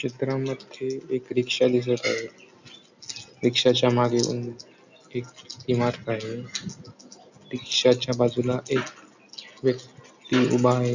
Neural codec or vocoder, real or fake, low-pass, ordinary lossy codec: none; real; 7.2 kHz; none